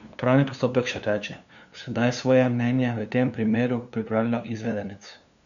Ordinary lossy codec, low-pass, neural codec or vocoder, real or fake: none; 7.2 kHz; codec, 16 kHz, 2 kbps, FunCodec, trained on LibriTTS, 25 frames a second; fake